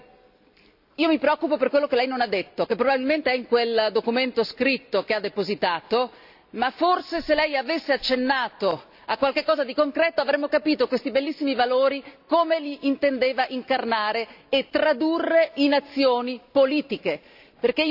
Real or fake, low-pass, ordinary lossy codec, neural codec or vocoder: real; 5.4 kHz; AAC, 48 kbps; none